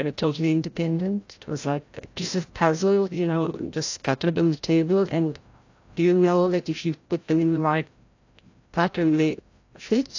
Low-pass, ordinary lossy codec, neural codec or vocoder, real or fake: 7.2 kHz; AAC, 48 kbps; codec, 16 kHz, 0.5 kbps, FreqCodec, larger model; fake